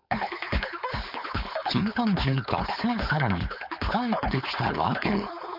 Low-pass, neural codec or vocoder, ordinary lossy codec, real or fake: 5.4 kHz; codec, 16 kHz, 4.8 kbps, FACodec; none; fake